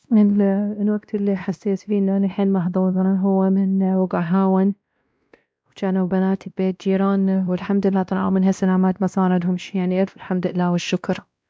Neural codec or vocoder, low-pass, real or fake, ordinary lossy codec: codec, 16 kHz, 1 kbps, X-Codec, WavLM features, trained on Multilingual LibriSpeech; none; fake; none